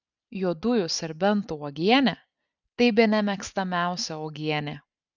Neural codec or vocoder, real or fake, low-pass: none; real; 7.2 kHz